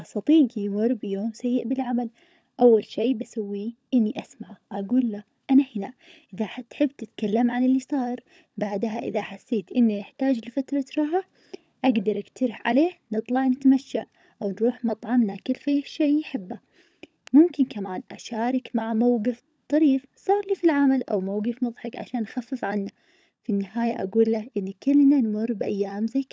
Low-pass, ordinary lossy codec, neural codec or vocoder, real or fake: none; none; codec, 16 kHz, 16 kbps, FunCodec, trained on LibriTTS, 50 frames a second; fake